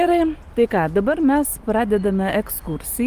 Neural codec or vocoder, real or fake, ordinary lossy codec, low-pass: none; real; Opus, 24 kbps; 14.4 kHz